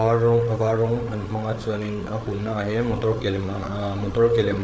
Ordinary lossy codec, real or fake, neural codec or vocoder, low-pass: none; fake; codec, 16 kHz, 8 kbps, FreqCodec, larger model; none